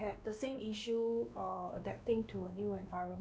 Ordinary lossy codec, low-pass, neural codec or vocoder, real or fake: none; none; codec, 16 kHz, 2 kbps, X-Codec, WavLM features, trained on Multilingual LibriSpeech; fake